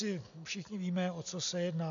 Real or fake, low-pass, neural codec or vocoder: real; 7.2 kHz; none